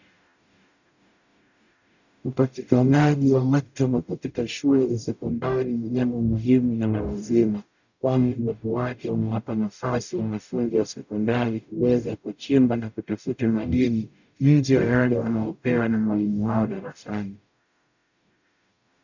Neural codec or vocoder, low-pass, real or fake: codec, 44.1 kHz, 0.9 kbps, DAC; 7.2 kHz; fake